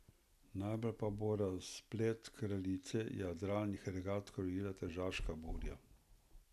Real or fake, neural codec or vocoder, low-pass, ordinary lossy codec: real; none; 14.4 kHz; none